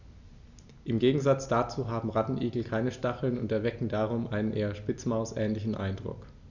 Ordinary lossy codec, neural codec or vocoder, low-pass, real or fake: none; none; 7.2 kHz; real